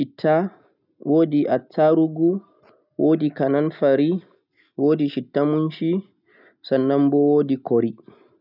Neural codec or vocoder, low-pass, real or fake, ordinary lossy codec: none; 5.4 kHz; real; none